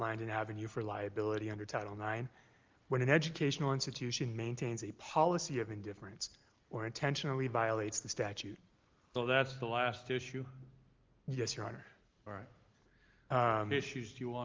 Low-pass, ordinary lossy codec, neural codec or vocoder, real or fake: 7.2 kHz; Opus, 32 kbps; none; real